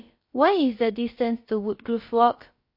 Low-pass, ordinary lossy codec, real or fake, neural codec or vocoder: 5.4 kHz; MP3, 32 kbps; fake; codec, 16 kHz, about 1 kbps, DyCAST, with the encoder's durations